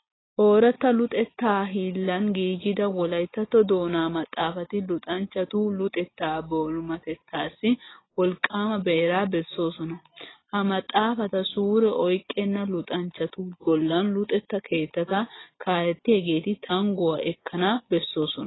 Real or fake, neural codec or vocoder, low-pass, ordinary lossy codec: real; none; 7.2 kHz; AAC, 16 kbps